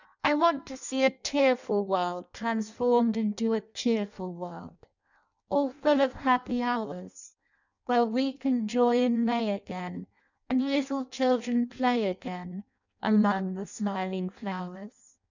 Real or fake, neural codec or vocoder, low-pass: fake; codec, 16 kHz in and 24 kHz out, 0.6 kbps, FireRedTTS-2 codec; 7.2 kHz